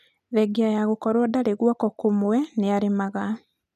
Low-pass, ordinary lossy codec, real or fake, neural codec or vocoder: 14.4 kHz; none; real; none